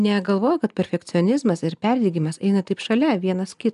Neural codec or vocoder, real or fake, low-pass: none; real; 10.8 kHz